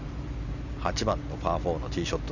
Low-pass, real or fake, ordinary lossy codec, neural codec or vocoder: 7.2 kHz; real; none; none